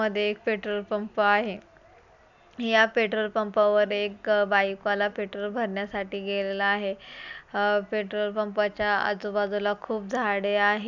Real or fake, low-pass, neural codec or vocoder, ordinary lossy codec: real; 7.2 kHz; none; none